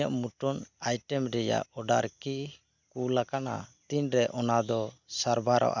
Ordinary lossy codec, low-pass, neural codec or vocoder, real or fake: none; 7.2 kHz; none; real